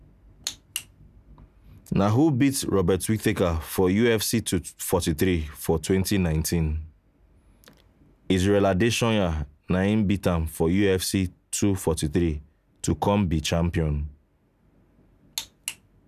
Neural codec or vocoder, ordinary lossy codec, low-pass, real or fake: none; none; 14.4 kHz; real